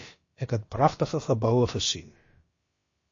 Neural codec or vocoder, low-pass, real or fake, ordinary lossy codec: codec, 16 kHz, about 1 kbps, DyCAST, with the encoder's durations; 7.2 kHz; fake; MP3, 32 kbps